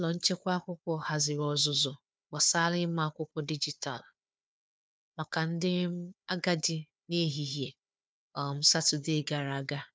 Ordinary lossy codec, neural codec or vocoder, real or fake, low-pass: none; codec, 16 kHz, 4 kbps, FunCodec, trained on Chinese and English, 50 frames a second; fake; none